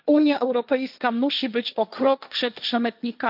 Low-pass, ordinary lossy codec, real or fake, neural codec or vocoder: 5.4 kHz; none; fake; codec, 16 kHz, 1.1 kbps, Voila-Tokenizer